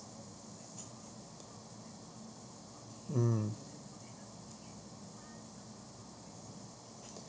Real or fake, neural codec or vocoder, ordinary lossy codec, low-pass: real; none; none; none